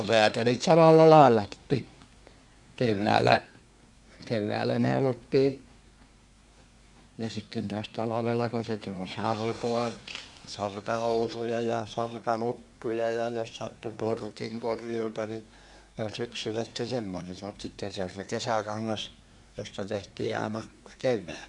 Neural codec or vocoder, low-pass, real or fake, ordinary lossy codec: codec, 24 kHz, 1 kbps, SNAC; 10.8 kHz; fake; none